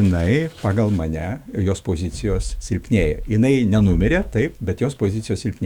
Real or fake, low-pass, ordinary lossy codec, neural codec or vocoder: fake; 19.8 kHz; Opus, 64 kbps; autoencoder, 48 kHz, 128 numbers a frame, DAC-VAE, trained on Japanese speech